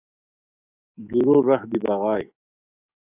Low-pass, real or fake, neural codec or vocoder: 3.6 kHz; fake; codec, 44.1 kHz, 7.8 kbps, DAC